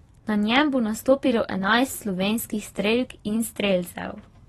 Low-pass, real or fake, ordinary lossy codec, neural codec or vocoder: 19.8 kHz; fake; AAC, 32 kbps; vocoder, 44.1 kHz, 128 mel bands every 512 samples, BigVGAN v2